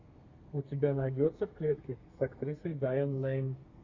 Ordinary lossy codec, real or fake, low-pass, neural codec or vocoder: Opus, 64 kbps; fake; 7.2 kHz; codec, 32 kHz, 1.9 kbps, SNAC